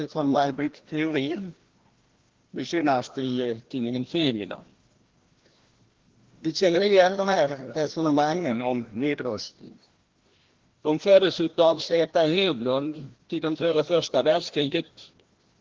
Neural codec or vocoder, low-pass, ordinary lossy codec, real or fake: codec, 16 kHz, 1 kbps, FreqCodec, larger model; 7.2 kHz; Opus, 16 kbps; fake